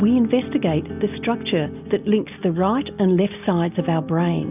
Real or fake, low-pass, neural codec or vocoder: real; 3.6 kHz; none